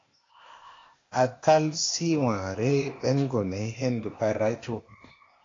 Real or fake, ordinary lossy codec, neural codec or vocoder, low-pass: fake; AAC, 32 kbps; codec, 16 kHz, 0.8 kbps, ZipCodec; 7.2 kHz